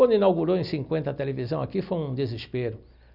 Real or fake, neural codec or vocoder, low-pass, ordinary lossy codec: real; none; 5.4 kHz; none